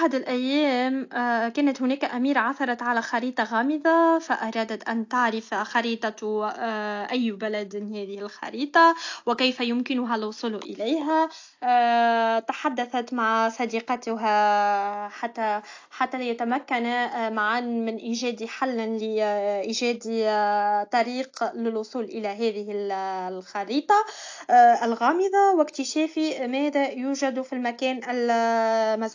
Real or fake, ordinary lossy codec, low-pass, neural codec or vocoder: real; AAC, 48 kbps; 7.2 kHz; none